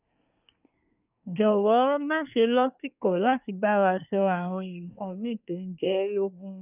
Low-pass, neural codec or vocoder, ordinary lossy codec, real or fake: 3.6 kHz; codec, 24 kHz, 1 kbps, SNAC; none; fake